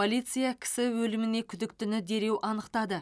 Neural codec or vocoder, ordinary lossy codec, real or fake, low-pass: none; none; real; none